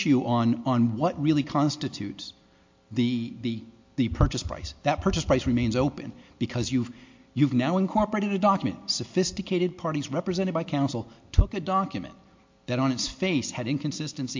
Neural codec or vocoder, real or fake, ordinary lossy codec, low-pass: none; real; MP3, 48 kbps; 7.2 kHz